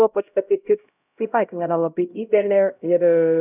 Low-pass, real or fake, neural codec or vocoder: 3.6 kHz; fake; codec, 16 kHz, 0.5 kbps, X-Codec, HuBERT features, trained on LibriSpeech